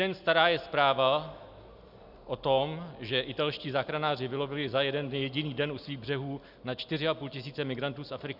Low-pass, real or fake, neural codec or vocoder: 5.4 kHz; real; none